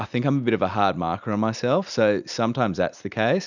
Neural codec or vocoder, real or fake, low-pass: none; real; 7.2 kHz